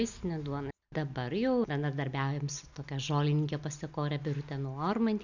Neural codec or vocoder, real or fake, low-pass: none; real; 7.2 kHz